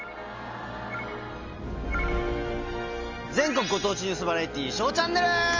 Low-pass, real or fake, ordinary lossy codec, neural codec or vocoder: 7.2 kHz; real; Opus, 32 kbps; none